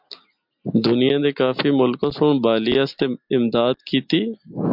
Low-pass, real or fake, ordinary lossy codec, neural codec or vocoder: 5.4 kHz; real; MP3, 48 kbps; none